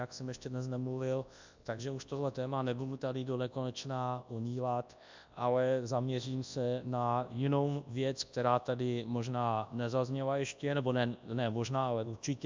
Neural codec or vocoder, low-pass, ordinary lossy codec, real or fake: codec, 24 kHz, 0.9 kbps, WavTokenizer, large speech release; 7.2 kHz; MP3, 64 kbps; fake